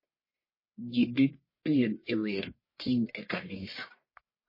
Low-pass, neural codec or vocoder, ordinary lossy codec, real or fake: 5.4 kHz; codec, 44.1 kHz, 1.7 kbps, Pupu-Codec; MP3, 24 kbps; fake